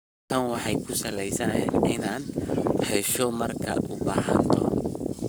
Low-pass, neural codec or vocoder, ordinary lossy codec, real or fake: none; codec, 44.1 kHz, 7.8 kbps, Pupu-Codec; none; fake